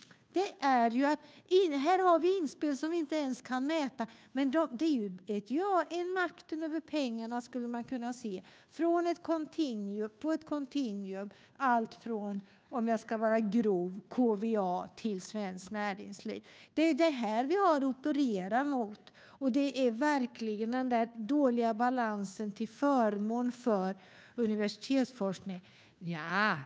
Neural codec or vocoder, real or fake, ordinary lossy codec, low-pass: codec, 16 kHz, 2 kbps, FunCodec, trained on Chinese and English, 25 frames a second; fake; none; none